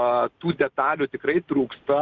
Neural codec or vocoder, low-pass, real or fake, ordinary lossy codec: none; 7.2 kHz; real; Opus, 24 kbps